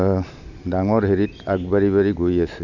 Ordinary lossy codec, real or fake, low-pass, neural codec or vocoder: none; real; 7.2 kHz; none